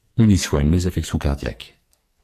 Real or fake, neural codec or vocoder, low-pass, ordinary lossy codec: fake; codec, 44.1 kHz, 2.6 kbps, SNAC; 14.4 kHz; AAC, 64 kbps